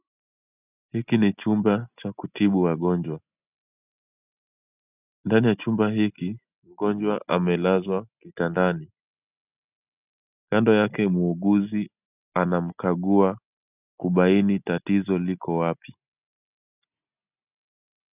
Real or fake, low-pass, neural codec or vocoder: real; 3.6 kHz; none